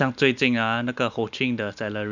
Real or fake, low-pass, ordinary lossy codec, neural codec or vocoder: real; 7.2 kHz; none; none